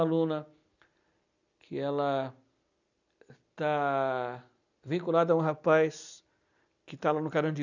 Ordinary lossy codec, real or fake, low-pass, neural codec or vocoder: none; real; 7.2 kHz; none